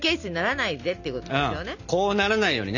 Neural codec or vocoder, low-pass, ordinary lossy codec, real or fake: none; 7.2 kHz; none; real